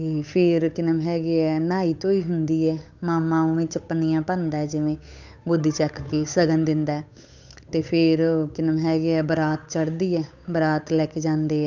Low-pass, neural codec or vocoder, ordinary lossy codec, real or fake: 7.2 kHz; codec, 16 kHz, 8 kbps, FunCodec, trained on Chinese and English, 25 frames a second; none; fake